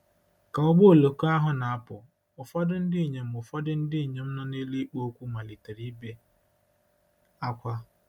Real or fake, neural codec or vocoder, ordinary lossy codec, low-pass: real; none; none; 19.8 kHz